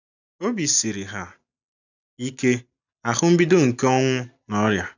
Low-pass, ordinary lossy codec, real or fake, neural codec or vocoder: 7.2 kHz; none; real; none